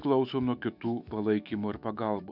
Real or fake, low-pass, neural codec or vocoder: fake; 5.4 kHz; autoencoder, 48 kHz, 128 numbers a frame, DAC-VAE, trained on Japanese speech